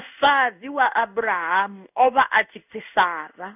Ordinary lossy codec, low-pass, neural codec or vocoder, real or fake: none; 3.6 kHz; codec, 16 kHz in and 24 kHz out, 1 kbps, XY-Tokenizer; fake